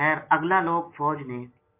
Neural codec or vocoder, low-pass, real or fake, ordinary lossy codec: none; 3.6 kHz; real; MP3, 24 kbps